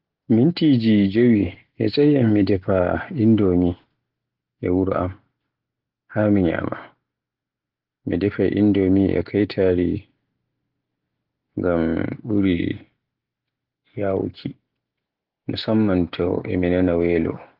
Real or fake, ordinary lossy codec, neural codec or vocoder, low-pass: real; Opus, 16 kbps; none; 5.4 kHz